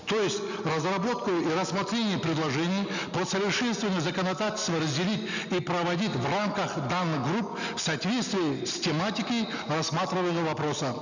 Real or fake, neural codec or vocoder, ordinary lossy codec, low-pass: real; none; none; 7.2 kHz